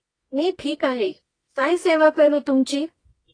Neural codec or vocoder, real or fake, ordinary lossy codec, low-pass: codec, 24 kHz, 0.9 kbps, WavTokenizer, medium music audio release; fake; AAC, 32 kbps; 9.9 kHz